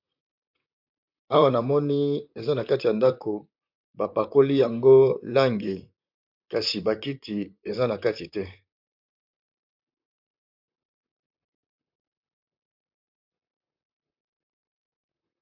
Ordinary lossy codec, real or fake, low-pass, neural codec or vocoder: MP3, 48 kbps; fake; 5.4 kHz; vocoder, 44.1 kHz, 128 mel bands, Pupu-Vocoder